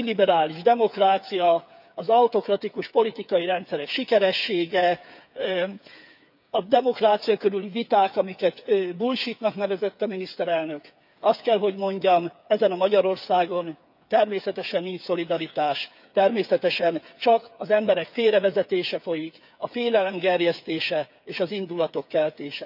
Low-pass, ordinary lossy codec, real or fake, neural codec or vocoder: 5.4 kHz; none; fake; codec, 16 kHz, 8 kbps, FreqCodec, smaller model